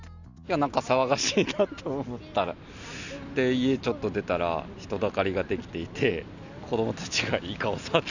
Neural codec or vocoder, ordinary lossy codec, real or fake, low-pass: none; none; real; 7.2 kHz